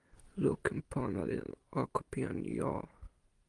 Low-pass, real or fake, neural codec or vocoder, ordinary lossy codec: 10.8 kHz; real; none; Opus, 32 kbps